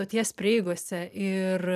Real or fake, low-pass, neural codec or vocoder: fake; 14.4 kHz; vocoder, 44.1 kHz, 128 mel bands every 256 samples, BigVGAN v2